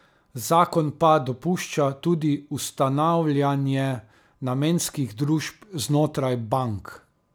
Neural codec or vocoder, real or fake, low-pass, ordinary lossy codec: none; real; none; none